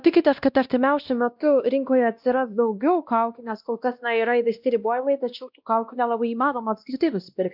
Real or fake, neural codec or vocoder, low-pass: fake; codec, 16 kHz, 1 kbps, X-Codec, WavLM features, trained on Multilingual LibriSpeech; 5.4 kHz